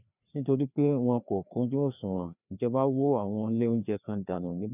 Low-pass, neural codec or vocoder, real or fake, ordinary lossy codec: 3.6 kHz; codec, 16 kHz, 2 kbps, FreqCodec, larger model; fake; none